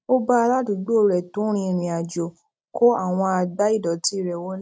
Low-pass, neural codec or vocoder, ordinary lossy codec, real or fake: none; none; none; real